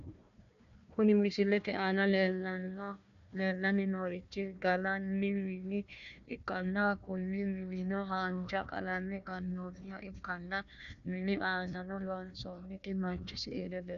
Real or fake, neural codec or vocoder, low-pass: fake; codec, 16 kHz, 1 kbps, FunCodec, trained on Chinese and English, 50 frames a second; 7.2 kHz